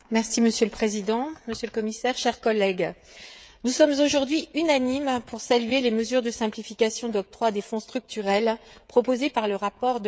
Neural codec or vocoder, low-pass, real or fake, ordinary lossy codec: codec, 16 kHz, 16 kbps, FreqCodec, smaller model; none; fake; none